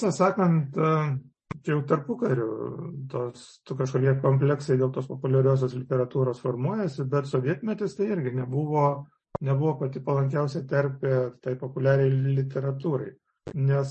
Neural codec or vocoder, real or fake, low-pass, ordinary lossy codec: none; real; 10.8 kHz; MP3, 32 kbps